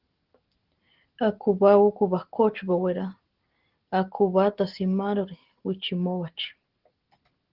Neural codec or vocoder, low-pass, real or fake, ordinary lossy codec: none; 5.4 kHz; real; Opus, 16 kbps